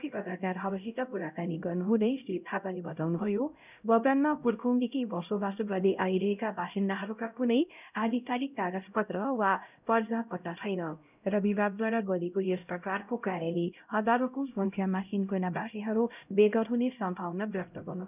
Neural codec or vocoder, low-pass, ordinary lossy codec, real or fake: codec, 16 kHz, 0.5 kbps, X-Codec, HuBERT features, trained on LibriSpeech; 3.6 kHz; none; fake